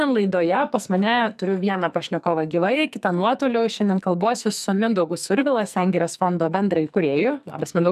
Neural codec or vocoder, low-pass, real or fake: codec, 44.1 kHz, 2.6 kbps, SNAC; 14.4 kHz; fake